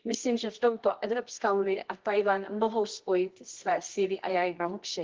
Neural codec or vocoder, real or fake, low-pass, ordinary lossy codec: codec, 24 kHz, 0.9 kbps, WavTokenizer, medium music audio release; fake; 7.2 kHz; Opus, 16 kbps